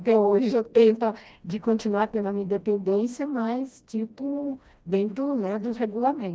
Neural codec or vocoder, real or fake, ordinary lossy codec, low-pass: codec, 16 kHz, 1 kbps, FreqCodec, smaller model; fake; none; none